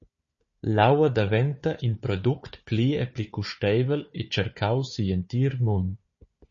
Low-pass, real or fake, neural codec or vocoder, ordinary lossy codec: 7.2 kHz; fake; codec, 16 kHz, 4 kbps, FunCodec, trained on Chinese and English, 50 frames a second; MP3, 32 kbps